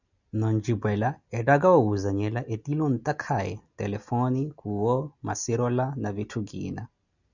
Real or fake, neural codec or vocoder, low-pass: real; none; 7.2 kHz